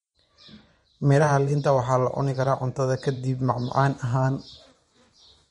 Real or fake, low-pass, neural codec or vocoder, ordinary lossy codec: fake; 10.8 kHz; vocoder, 24 kHz, 100 mel bands, Vocos; MP3, 48 kbps